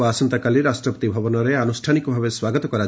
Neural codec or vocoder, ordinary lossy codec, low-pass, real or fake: none; none; none; real